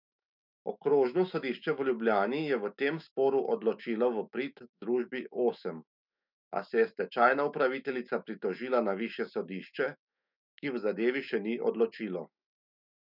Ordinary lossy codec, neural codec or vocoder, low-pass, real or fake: none; autoencoder, 48 kHz, 128 numbers a frame, DAC-VAE, trained on Japanese speech; 5.4 kHz; fake